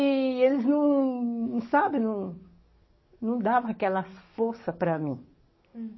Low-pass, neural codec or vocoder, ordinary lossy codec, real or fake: 7.2 kHz; codec, 44.1 kHz, 7.8 kbps, DAC; MP3, 24 kbps; fake